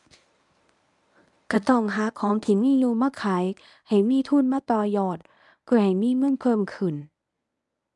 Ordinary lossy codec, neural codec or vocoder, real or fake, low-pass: none; codec, 24 kHz, 0.9 kbps, WavTokenizer, medium speech release version 1; fake; 10.8 kHz